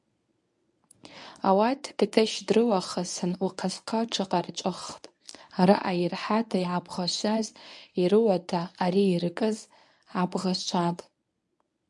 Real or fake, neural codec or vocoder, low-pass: fake; codec, 24 kHz, 0.9 kbps, WavTokenizer, medium speech release version 1; 10.8 kHz